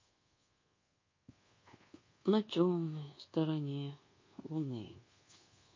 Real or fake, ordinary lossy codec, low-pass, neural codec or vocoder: fake; MP3, 32 kbps; 7.2 kHz; codec, 24 kHz, 1.2 kbps, DualCodec